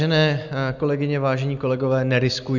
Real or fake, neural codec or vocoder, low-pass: real; none; 7.2 kHz